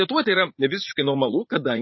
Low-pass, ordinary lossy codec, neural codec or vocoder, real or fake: 7.2 kHz; MP3, 24 kbps; vocoder, 44.1 kHz, 80 mel bands, Vocos; fake